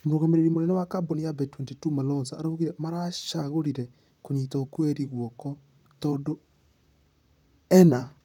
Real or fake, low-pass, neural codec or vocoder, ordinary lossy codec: fake; 19.8 kHz; vocoder, 44.1 kHz, 128 mel bands, Pupu-Vocoder; none